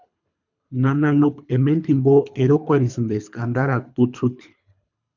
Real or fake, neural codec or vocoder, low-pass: fake; codec, 24 kHz, 3 kbps, HILCodec; 7.2 kHz